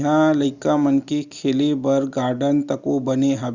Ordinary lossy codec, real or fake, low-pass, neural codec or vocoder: Opus, 64 kbps; real; 7.2 kHz; none